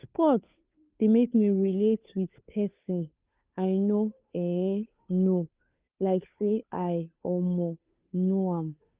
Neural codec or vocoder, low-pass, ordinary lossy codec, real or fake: codec, 16 kHz, 2 kbps, FunCodec, trained on Chinese and English, 25 frames a second; 3.6 kHz; Opus, 24 kbps; fake